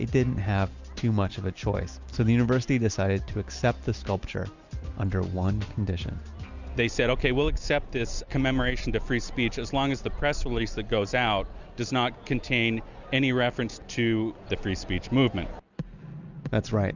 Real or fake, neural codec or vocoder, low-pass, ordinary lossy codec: real; none; 7.2 kHz; Opus, 64 kbps